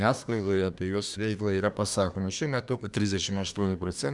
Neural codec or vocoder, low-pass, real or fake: codec, 24 kHz, 1 kbps, SNAC; 10.8 kHz; fake